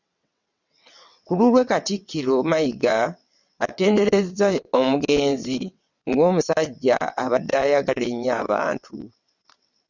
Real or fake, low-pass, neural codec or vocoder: fake; 7.2 kHz; vocoder, 22.05 kHz, 80 mel bands, WaveNeXt